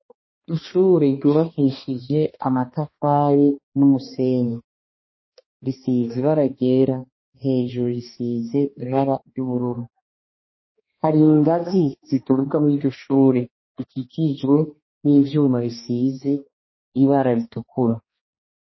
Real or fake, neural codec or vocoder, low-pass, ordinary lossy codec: fake; codec, 16 kHz, 2 kbps, X-Codec, HuBERT features, trained on balanced general audio; 7.2 kHz; MP3, 24 kbps